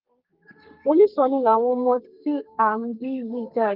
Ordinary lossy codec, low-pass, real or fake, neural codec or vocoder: Opus, 24 kbps; 5.4 kHz; fake; codec, 32 kHz, 1.9 kbps, SNAC